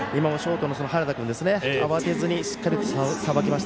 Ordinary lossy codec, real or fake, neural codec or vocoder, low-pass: none; real; none; none